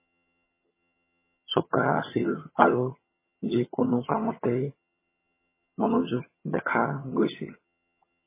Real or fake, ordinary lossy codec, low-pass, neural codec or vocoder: fake; MP3, 16 kbps; 3.6 kHz; vocoder, 22.05 kHz, 80 mel bands, HiFi-GAN